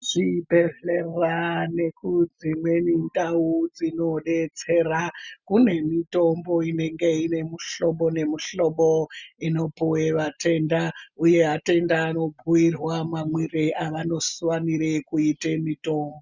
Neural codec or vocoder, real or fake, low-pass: none; real; 7.2 kHz